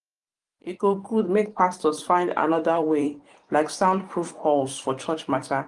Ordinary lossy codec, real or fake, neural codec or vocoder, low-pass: Opus, 32 kbps; fake; vocoder, 44.1 kHz, 128 mel bands every 512 samples, BigVGAN v2; 10.8 kHz